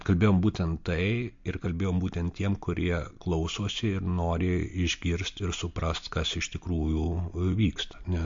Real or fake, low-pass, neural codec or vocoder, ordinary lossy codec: real; 7.2 kHz; none; MP3, 48 kbps